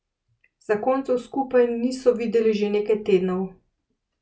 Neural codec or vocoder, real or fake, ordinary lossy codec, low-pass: none; real; none; none